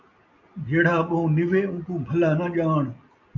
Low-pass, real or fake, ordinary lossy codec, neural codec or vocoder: 7.2 kHz; real; MP3, 64 kbps; none